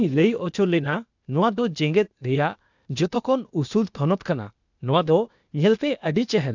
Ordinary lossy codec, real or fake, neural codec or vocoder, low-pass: none; fake; codec, 16 kHz, 0.8 kbps, ZipCodec; 7.2 kHz